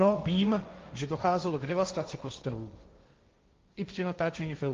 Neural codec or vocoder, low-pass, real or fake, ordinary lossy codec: codec, 16 kHz, 1.1 kbps, Voila-Tokenizer; 7.2 kHz; fake; Opus, 32 kbps